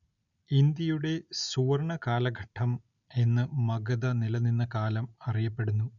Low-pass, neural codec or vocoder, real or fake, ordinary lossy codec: 7.2 kHz; none; real; none